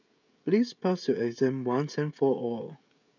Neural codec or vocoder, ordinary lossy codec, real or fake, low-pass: codec, 16 kHz, 16 kbps, FreqCodec, smaller model; none; fake; 7.2 kHz